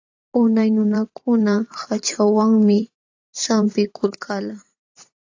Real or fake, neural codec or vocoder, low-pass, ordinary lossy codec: fake; vocoder, 44.1 kHz, 128 mel bands every 512 samples, BigVGAN v2; 7.2 kHz; AAC, 48 kbps